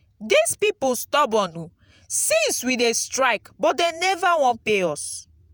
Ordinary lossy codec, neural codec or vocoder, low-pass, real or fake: none; vocoder, 48 kHz, 128 mel bands, Vocos; none; fake